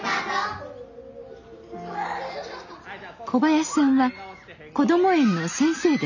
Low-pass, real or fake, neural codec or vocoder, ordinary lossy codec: 7.2 kHz; real; none; none